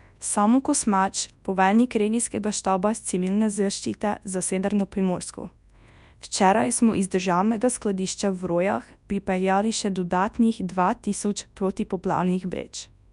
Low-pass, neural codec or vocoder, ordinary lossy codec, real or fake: 10.8 kHz; codec, 24 kHz, 0.9 kbps, WavTokenizer, large speech release; none; fake